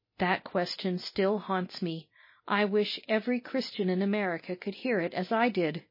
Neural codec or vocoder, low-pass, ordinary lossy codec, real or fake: none; 5.4 kHz; MP3, 24 kbps; real